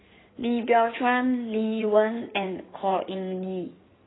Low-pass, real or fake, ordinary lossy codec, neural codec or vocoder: 7.2 kHz; fake; AAC, 16 kbps; codec, 16 kHz in and 24 kHz out, 2.2 kbps, FireRedTTS-2 codec